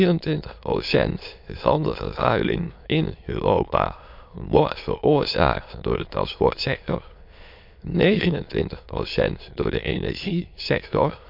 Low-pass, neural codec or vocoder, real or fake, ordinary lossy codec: 5.4 kHz; autoencoder, 22.05 kHz, a latent of 192 numbers a frame, VITS, trained on many speakers; fake; MP3, 48 kbps